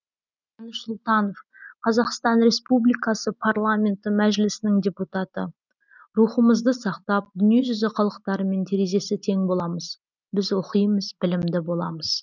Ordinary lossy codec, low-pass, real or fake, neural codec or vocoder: none; 7.2 kHz; real; none